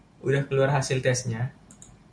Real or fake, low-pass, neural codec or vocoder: real; 9.9 kHz; none